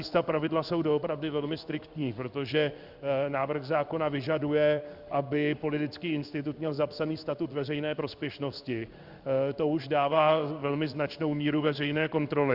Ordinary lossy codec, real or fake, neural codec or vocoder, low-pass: Opus, 64 kbps; fake; codec, 16 kHz in and 24 kHz out, 1 kbps, XY-Tokenizer; 5.4 kHz